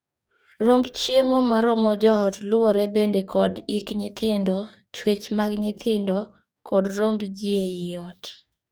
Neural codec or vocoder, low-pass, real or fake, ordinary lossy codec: codec, 44.1 kHz, 2.6 kbps, DAC; none; fake; none